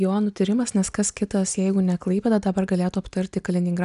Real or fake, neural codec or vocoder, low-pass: real; none; 10.8 kHz